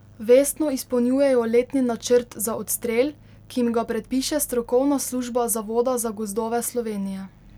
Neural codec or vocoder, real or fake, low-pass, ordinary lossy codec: none; real; 19.8 kHz; none